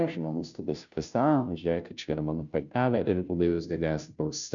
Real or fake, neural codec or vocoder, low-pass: fake; codec, 16 kHz, 0.5 kbps, FunCodec, trained on Chinese and English, 25 frames a second; 7.2 kHz